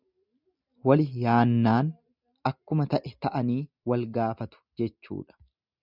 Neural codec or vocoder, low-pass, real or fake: none; 5.4 kHz; real